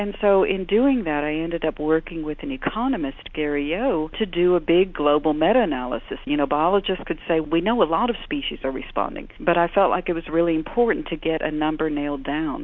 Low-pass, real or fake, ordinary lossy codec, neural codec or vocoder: 7.2 kHz; real; MP3, 48 kbps; none